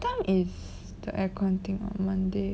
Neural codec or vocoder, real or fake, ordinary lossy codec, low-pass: none; real; none; none